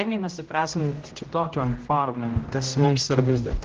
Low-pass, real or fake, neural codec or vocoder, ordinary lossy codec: 7.2 kHz; fake; codec, 16 kHz, 0.5 kbps, X-Codec, HuBERT features, trained on general audio; Opus, 16 kbps